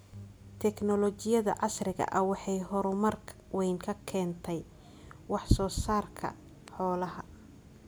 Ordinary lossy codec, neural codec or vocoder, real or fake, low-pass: none; none; real; none